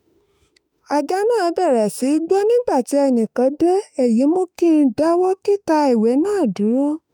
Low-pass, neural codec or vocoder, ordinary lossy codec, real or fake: none; autoencoder, 48 kHz, 32 numbers a frame, DAC-VAE, trained on Japanese speech; none; fake